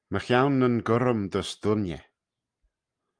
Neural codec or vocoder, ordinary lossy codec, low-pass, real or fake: none; Opus, 32 kbps; 9.9 kHz; real